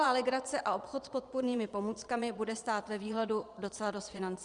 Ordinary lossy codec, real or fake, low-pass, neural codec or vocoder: Opus, 64 kbps; fake; 9.9 kHz; vocoder, 22.05 kHz, 80 mel bands, Vocos